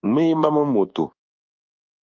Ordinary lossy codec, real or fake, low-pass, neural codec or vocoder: Opus, 24 kbps; fake; 7.2 kHz; codec, 24 kHz, 1.2 kbps, DualCodec